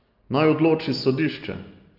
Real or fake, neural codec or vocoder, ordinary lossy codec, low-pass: real; none; Opus, 32 kbps; 5.4 kHz